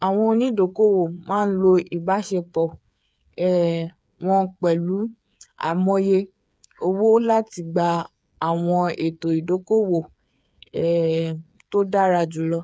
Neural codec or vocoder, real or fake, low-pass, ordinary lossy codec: codec, 16 kHz, 8 kbps, FreqCodec, smaller model; fake; none; none